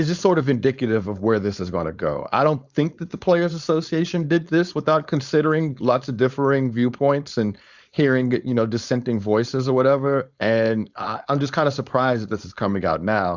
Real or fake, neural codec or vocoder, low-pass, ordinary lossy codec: fake; codec, 16 kHz, 4.8 kbps, FACodec; 7.2 kHz; Opus, 64 kbps